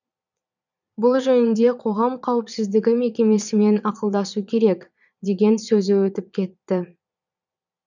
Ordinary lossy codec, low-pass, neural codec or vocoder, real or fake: none; 7.2 kHz; vocoder, 22.05 kHz, 80 mel bands, Vocos; fake